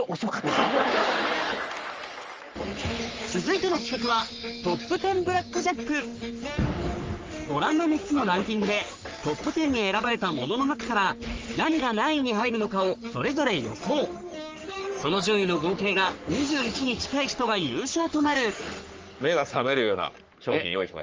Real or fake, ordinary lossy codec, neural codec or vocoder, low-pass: fake; Opus, 24 kbps; codec, 44.1 kHz, 3.4 kbps, Pupu-Codec; 7.2 kHz